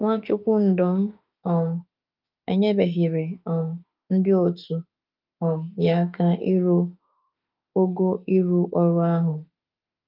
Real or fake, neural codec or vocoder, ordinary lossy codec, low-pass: fake; autoencoder, 48 kHz, 32 numbers a frame, DAC-VAE, trained on Japanese speech; Opus, 24 kbps; 5.4 kHz